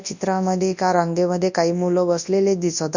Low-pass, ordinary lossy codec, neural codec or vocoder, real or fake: 7.2 kHz; none; codec, 24 kHz, 0.9 kbps, WavTokenizer, large speech release; fake